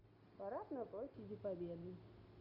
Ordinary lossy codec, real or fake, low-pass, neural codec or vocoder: none; real; 5.4 kHz; none